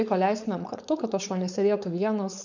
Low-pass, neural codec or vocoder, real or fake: 7.2 kHz; codec, 16 kHz, 4.8 kbps, FACodec; fake